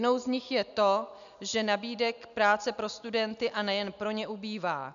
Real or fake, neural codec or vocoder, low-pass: real; none; 7.2 kHz